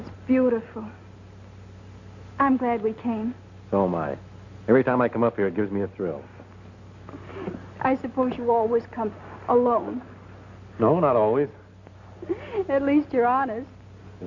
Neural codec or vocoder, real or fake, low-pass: none; real; 7.2 kHz